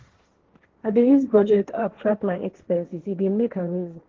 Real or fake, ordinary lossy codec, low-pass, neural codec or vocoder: fake; Opus, 16 kbps; 7.2 kHz; codec, 16 kHz, 1.1 kbps, Voila-Tokenizer